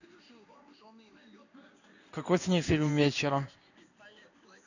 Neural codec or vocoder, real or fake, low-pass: codec, 16 kHz in and 24 kHz out, 1 kbps, XY-Tokenizer; fake; 7.2 kHz